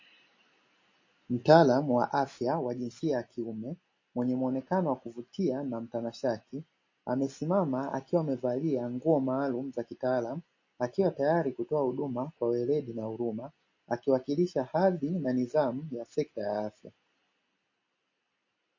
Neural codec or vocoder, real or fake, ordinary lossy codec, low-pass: none; real; MP3, 32 kbps; 7.2 kHz